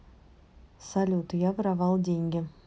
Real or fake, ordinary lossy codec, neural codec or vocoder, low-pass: real; none; none; none